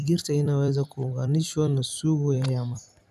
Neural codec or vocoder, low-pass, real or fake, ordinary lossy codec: vocoder, 44.1 kHz, 128 mel bands every 256 samples, BigVGAN v2; 14.4 kHz; fake; none